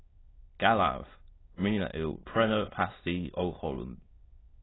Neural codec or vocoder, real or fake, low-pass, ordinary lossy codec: autoencoder, 22.05 kHz, a latent of 192 numbers a frame, VITS, trained on many speakers; fake; 7.2 kHz; AAC, 16 kbps